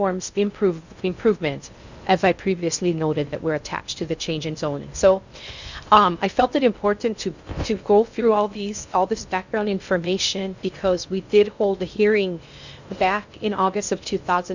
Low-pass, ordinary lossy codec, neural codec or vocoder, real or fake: 7.2 kHz; Opus, 64 kbps; codec, 16 kHz in and 24 kHz out, 0.6 kbps, FocalCodec, streaming, 4096 codes; fake